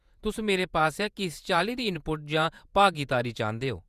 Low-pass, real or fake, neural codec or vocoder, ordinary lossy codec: 14.4 kHz; fake; vocoder, 48 kHz, 128 mel bands, Vocos; Opus, 64 kbps